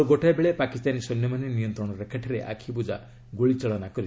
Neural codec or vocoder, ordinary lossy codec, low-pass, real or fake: none; none; none; real